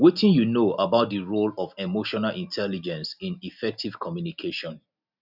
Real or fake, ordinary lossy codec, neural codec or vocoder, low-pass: real; none; none; 5.4 kHz